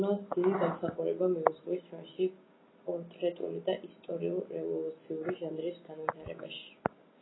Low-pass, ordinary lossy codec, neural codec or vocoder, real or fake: 7.2 kHz; AAC, 16 kbps; none; real